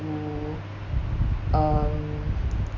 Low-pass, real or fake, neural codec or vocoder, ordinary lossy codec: 7.2 kHz; real; none; none